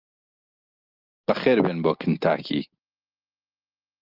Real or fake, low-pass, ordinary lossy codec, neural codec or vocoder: real; 5.4 kHz; Opus, 32 kbps; none